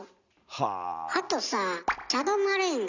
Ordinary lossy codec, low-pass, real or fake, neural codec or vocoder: none; 7.2 kHz; real; none